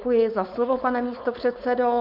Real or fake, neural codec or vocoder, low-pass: fake; codec, 16 kHz, 4.8 kbps, FACodec; 5.4 kHz